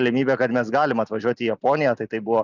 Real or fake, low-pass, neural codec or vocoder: real; 7.2 kHz; none